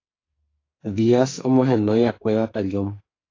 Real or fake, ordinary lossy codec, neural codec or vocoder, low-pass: fake; AAC, 32 kbps; codec, 44.1 kHz, 2.6 kbps, SNAC; 7.2 kHz